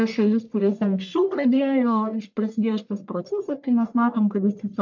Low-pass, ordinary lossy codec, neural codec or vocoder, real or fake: 7.2 kHz; MP3, 64 kbps; codec, 44.1 kHz, 1.7 kbps, Pupu-Codec; fake